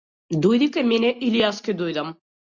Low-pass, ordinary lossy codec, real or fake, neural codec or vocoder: 7.2 kHz; Opus, 64 kbps; real; none